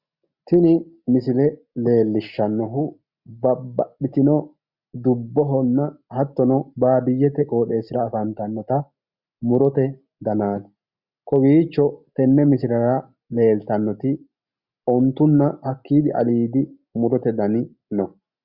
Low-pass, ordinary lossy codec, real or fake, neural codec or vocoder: 5.4 kHz; Opus, 64 kbps; real; none